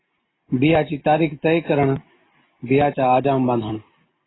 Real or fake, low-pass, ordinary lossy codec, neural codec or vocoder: real; 7.2 kHz; AAC, 16 kbps; none